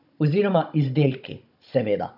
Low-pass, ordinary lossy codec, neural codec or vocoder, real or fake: 5.4 kHz; none; codec, 16 kHz, 16 kbps, FunCodec, trained on Chinese and English, 50 frames a second; fake